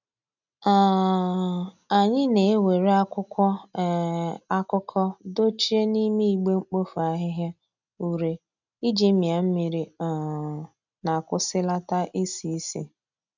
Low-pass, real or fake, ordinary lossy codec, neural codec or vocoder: 7.2 kHz; real; none; none